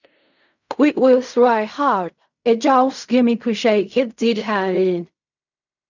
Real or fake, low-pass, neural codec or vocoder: fake; 7.2 kHz; codec, 16 kHz in and 24 kHz out, 0.4 kbps, LongCat-Audio-Codec, fine tuned four codebook decoder